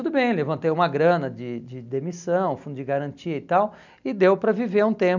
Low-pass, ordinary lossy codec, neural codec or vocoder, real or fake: 7.2 kHz; none; none; real